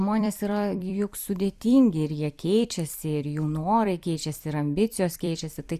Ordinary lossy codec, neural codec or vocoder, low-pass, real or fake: Opus, 64 kbps; vocoder, 44.1 kHz, 128 mel bands every 256 samples, BigVGAN v2; 14.4 kHz; fake